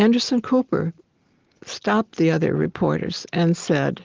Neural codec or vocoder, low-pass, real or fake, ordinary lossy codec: none; 7.2 kHz; real; Opus, 16 kbps